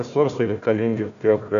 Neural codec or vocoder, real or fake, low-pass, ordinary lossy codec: codec, 16 kHz, 1 kbps, FunCodec, trained on Chinese and English, 50 frames a second; fake; 7.2 kHz; MP3, 96 kbps